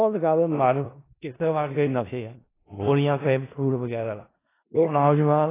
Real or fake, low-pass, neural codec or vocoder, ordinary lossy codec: fake; 3.6 kHz; codec, 16 kHz in and 24 kHz out, 0.4 kbps, LongCat-Audio-Codec, four codebook decoder; AAC, 16 kbps